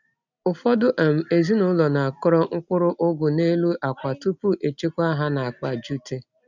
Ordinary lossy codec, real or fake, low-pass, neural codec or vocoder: none; real; 7.2 kHz; none